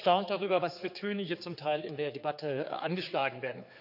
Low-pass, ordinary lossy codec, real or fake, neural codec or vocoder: 5.4 kHz; none; fake; codec, 16 kHz, 4 kbps, X-Codec, HuBERT features, trained on general audio